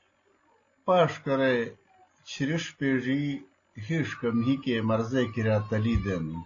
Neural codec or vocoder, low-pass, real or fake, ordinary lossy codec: none; 7.2 kHz; real; AAC, 32 kbps